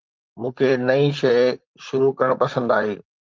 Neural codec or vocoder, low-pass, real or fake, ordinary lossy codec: vocoder, 44.1 kHz, 128 mel bands, Pupu-Vocoder; 7.2 kHz; fake; Opus, 32 kbps